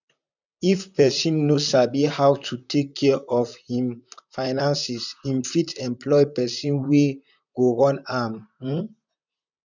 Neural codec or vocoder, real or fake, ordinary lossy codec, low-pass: vocoder, 44.1 kHz, 128 mel bands, Pupu-Vocoder; fake; none; 7.2 kHz